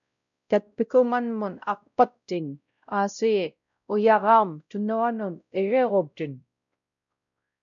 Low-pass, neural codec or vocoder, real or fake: 7.2 kHz; codec, 16 kHz, 0.5 kbps, X-Codec, WavLM features, trained on Multilingual LibriSpeech; fake